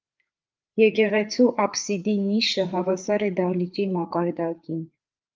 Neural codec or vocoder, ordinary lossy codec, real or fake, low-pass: codec, 16 kHz, 4 kbps, FreqCodec, larger model; Opus, 32 kbps; fake; 7.2 kHz